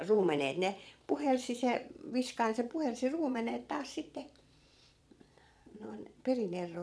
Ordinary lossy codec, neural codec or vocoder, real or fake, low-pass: none; vocoder, 22.05 kHz, 80 mel bands, Vocos; fake; none